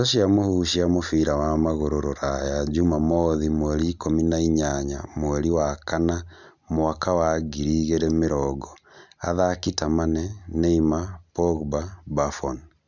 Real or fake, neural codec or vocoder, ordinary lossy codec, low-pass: real; none; none; 7.2 kHz